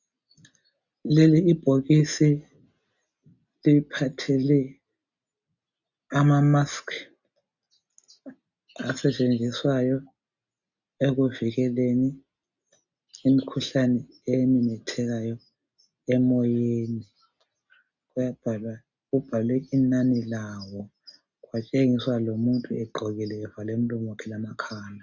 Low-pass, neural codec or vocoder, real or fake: 7.2 kHz; none; real